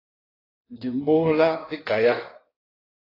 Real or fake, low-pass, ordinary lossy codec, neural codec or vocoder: fake; 5.4 kHz; AAC, 24 kbps; codec, 16 kHz in and 24 kHz out, 1.1 kbps, FireRedTTS-2 codec